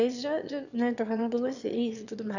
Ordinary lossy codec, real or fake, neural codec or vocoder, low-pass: none; fake; autoencoder, 22.05 kHz, a latent of 192 numbers a frame, VITS, trained on one speaker; 7.2 kHz